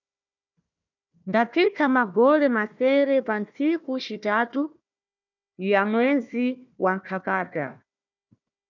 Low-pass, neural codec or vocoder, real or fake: 7.2 kHz; codec, 16 kHz, 1 kbps, FunCodec, trained on Chinese and English, 50 frames a second; fake